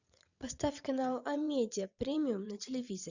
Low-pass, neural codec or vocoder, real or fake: 7.2 kHz; vocoder, 22.05 kHz, 80 mel bands, WaveNeXt; fake